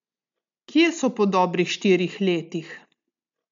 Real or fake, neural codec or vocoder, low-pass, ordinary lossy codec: real; none; 7.2 kHz; MP3, 64 kbps